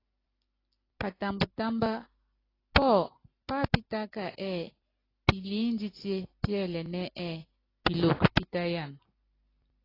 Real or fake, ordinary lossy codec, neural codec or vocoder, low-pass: real; AAC, 24 kbps; none; 5.4 kHz